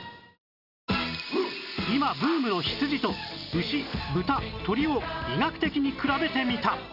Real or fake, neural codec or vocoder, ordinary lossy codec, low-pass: real; none; none; 5.4 kHz